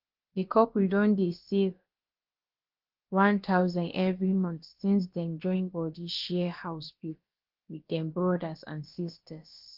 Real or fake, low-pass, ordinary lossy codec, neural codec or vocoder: fake; 5.4 kHz; Opus, 32 kbps; codec, 16 kHz, about 1 kbps, DyCAST, with the encoder's durations